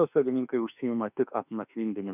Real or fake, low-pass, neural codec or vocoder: fake; 3.6 kHz; autoencoder, 48 kHz, 32 numbers a frame, DAC-VAE, trained on Japanese speech